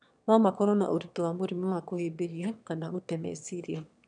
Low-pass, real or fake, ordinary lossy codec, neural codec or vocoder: 9.9 kHz; fake; none; autoencoder, 22.05 kHz, a latent of 192 numbers a frame, VITS, trained on one speaker